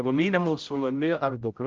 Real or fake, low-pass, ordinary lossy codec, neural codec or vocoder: fake; 7.2 kHz; Opus, 24 kbps; codec, 16 kHz, 0.5 kbps, X-Codec, HuBERT features, trained on general audio